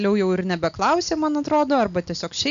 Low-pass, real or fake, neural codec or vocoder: 7.2 kHz; real; none